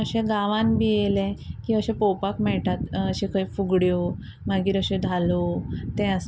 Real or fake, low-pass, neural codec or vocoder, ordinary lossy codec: real; none; none; none